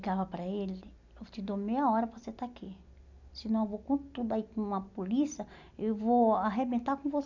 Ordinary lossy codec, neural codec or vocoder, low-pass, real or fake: none; none; 7.2 kHz; real